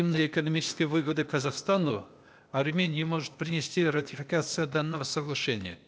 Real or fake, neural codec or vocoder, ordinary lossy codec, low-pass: fake; codec, 16 kHz, 0.8 kbps, ZipCodec; none; none